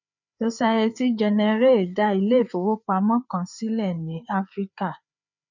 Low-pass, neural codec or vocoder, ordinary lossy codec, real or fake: 7.2 kHz; codec, 16 kHz, 4 kbps, FreqCodec, larger model; none; fake